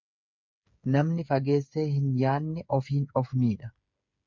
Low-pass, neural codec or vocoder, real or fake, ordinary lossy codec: 7.2 kHz; codec, 16 kHz, 8 kbps, FreqCodec, smaller model; fake; MP3, 64 kbps